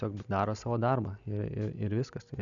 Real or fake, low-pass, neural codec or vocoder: real; 7.2 kHz; none